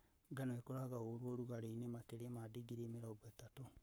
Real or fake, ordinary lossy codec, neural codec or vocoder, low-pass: fake; none; codec, 44.1 kHz, 7.8 kbps, Pupu-Codec; none